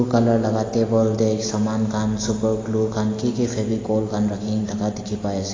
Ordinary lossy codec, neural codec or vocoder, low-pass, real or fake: AAC, 32 kbps; none; 7.2 kHz; real